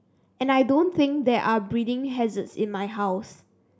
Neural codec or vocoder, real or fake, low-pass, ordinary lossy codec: none; real; none; none